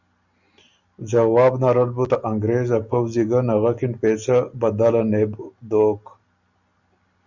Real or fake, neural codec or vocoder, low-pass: real; none; 7.2 kHz